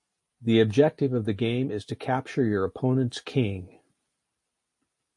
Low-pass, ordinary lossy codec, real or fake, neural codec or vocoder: 10.8 kHz; MP3, 48 kbps; real; none